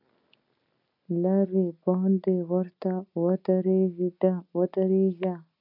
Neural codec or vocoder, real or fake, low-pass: none; real; 5.4 kHz